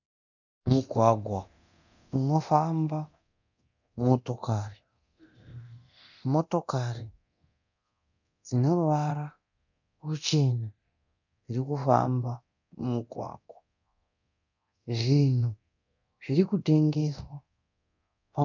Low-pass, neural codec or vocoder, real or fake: 7.2 kHz; codec, 24 kHz, 0.9 kbps, DualCodec; fake